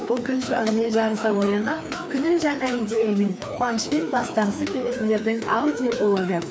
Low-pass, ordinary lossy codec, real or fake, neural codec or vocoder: none; none; fake; codec, 16 kHz, 2 kbps, FreqCodec, larger model